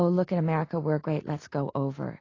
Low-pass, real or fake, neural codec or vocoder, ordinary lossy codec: 7.2 kHz; real; none; AAC, 32 kbps